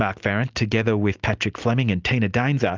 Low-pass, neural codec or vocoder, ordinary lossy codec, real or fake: 7.2 kHz; none; Opus, 32 kbps; real